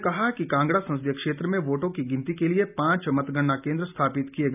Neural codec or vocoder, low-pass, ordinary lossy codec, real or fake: none; 3.6 kHz; none; real